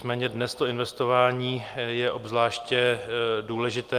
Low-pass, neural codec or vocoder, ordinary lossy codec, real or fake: 14.4 kHz; none; Opus, 32 kbps; real